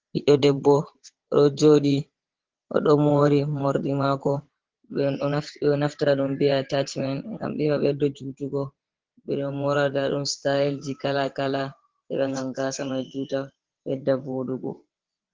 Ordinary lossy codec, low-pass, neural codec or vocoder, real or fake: Opus, 16 kbps; 7.2 kHz; vocoder, 22.05 kHz, 80 mel bands, WaveNeXt; fake